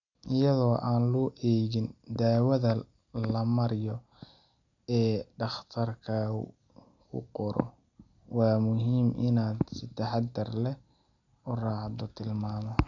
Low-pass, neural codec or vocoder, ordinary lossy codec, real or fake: 7.2 kHz; none; none; real